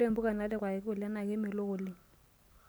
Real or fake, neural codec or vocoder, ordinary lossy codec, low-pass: real; none; none; none